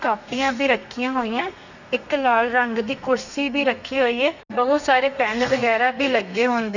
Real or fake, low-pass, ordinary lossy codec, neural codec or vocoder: fake; 7.2 kHz; none; codec, 32 kHz, 1.9 kbps, SNAC